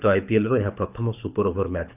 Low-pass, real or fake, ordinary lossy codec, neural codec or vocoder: 3.6 kHz; fake; none; codec, 24 kHz, 6 kbps, HILCodec